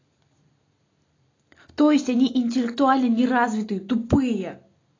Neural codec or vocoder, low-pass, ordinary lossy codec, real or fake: none; 7.2 kHz; AAC, 32 kbps; real